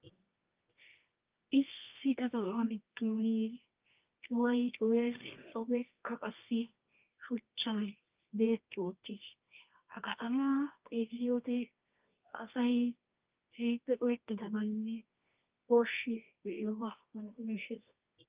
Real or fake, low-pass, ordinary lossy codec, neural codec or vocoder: fake; 3.6 kHz; Opus, 24 kbps; codec, 24 kHz, 0.9 kbps, WavTokenizer, medium music audio release